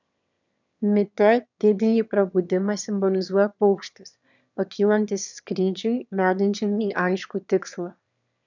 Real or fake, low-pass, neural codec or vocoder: fake; 7.2 kHz; autoencoder, 22.05 kHz, a latent of 192 numbers a frame, VITS, trained on one speaker